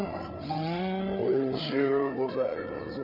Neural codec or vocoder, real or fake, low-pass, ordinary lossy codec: codec, 16 kHz, 8 kbps, FreqCodec, larger model; fake; 5.4 kHz; none